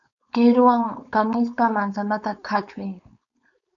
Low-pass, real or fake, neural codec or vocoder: 7.2 kHz; fake; codec, 16 kHz, 4.8 kbps, FACodec